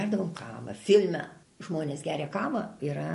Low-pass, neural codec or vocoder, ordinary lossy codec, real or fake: 14.4 kHz; none; MP3, 48 kbps; real